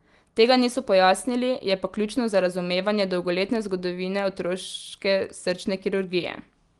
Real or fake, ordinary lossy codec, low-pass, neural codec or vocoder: real; Opus, 24 kbps; 10.8 kHz; none